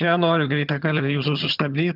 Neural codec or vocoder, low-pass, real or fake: vocoder, 22.05 kHz, 80 mel bands, HiFi-GAN; 5.4 kHz; fake